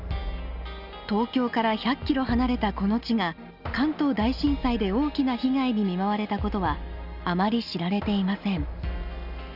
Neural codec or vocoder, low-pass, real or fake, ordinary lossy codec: none; 5.4 kHz; real; none